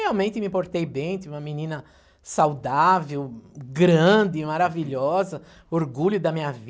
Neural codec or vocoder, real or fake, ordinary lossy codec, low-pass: none; real; none; none